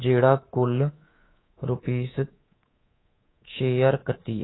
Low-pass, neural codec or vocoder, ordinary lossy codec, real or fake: 7.2 kHz; codec, 24 kHz, 3.1 kbps, DualCodec; AAC, 16 kbps; fake